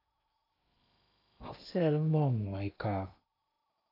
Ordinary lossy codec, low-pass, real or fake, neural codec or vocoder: AAC, 32 kbps; 5.4 kHz; fake; codec, 16 kHz in and 24 kHz out, 0.8 kbps, FocalCodec, streaming, 65536 codes